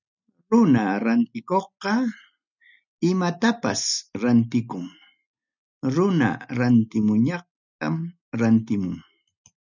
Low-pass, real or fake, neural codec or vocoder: 7.2 kHz; real; none